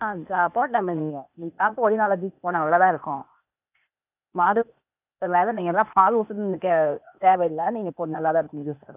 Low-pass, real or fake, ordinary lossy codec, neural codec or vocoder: 3.6 kHz; fake; none; codec, 16 kHz, 0.8 kbps, ZipCodec